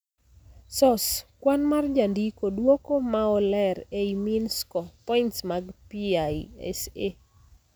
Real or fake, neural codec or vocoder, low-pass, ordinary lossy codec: real; none; none; none